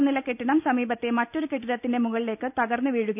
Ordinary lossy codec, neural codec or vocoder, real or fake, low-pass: none; none; real; 3.6 kHz